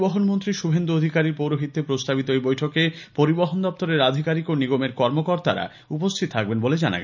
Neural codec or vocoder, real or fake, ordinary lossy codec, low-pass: none; real; none; 7.2 kHz